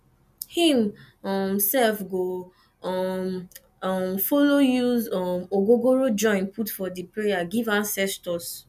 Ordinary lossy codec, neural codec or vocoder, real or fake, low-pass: none; none; real; 14.4 kHz